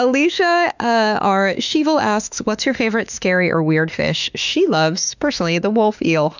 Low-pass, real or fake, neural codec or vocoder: 7.2 kHz; fake; autoencoder, 48 kHz, 32 numbers a frame, DAC-VAE, trained on Japanese speech